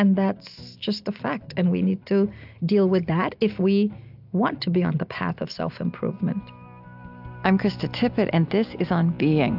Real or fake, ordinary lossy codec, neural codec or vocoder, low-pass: real; AAC, 48 kbps; none; 5.4 kHz